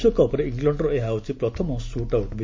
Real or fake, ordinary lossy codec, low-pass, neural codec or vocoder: real; MP3, 64 kbps; 7.2 kHz; none